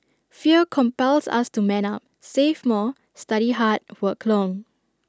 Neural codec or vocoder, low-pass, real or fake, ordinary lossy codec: none; none; real; none